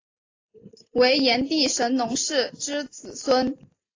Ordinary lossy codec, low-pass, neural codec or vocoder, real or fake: AAC, 32 kbps; 7.2 kHz; none; real